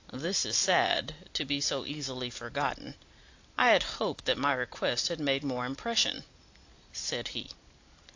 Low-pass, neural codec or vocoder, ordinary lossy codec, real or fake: 7.2 kHz; none; AAC, 48 kbps; real